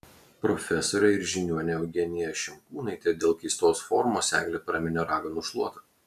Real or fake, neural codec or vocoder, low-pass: real; none; 14.4 kHz